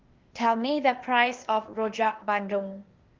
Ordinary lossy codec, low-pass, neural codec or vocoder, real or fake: Opus, 32 kbps; 7.2 kHz; codec, 16 kHz, 0.8 kbps, ZipCodec; fake